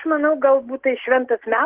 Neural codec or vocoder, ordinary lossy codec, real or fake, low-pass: none; Opus, 16 kbps; real; 3.6 kHz